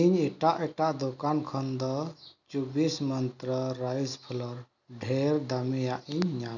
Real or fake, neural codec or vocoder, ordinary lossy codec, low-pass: real; none; none; 7.2 kHz